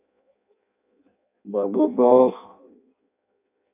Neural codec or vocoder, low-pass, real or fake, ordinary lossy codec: codec, 16 kHz in and 24 kHz out, 0.6 kbps, FireRedTTS-2 codec; 3.6 kHz; fake; MP3, 32 kbps